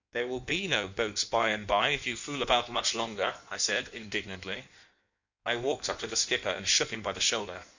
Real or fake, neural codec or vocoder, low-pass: fake; codec, 16 kHz in and 24 kHz out, 1.1 kbps, FireRedTTS-2 codec; 7.2 kHz